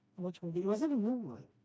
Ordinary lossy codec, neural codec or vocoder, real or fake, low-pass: none; codec, 16 kHz, 1 kbps, FreqCodec, smaller model; fake; none